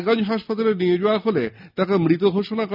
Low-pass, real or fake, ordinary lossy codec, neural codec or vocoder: 5.4 kHz; real; none; none